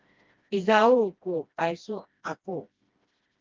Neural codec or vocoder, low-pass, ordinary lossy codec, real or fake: codec, 16 kHz, 1 kbps, FreqCodec, smaller model; 7.2 kHz; Opus, 32 kbps; fake